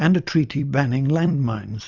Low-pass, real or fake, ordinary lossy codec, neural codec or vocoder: 7.2 kHz; fake; Opus, 64 kbps; vocoder, 44.1 kHz, 128 mel bands every 256 samples, BigVGAN v2